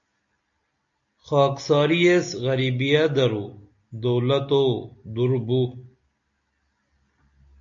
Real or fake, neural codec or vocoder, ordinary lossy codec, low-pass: real; none; AAC, 64 kbps; 7.2 kHz